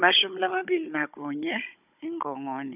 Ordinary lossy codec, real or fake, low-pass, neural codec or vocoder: none; fake; 3.6 kHz; codec, 16 kHz, 16 kbps, FunCodec, trained on Chinese and English, 50 frames a second